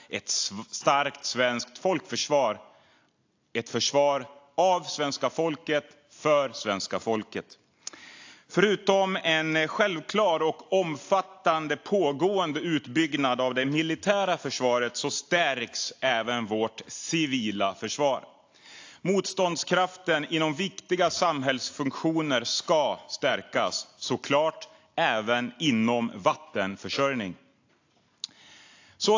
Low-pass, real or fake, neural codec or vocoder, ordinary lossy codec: 7.2 kHz; real; none; AAC, 48 kbps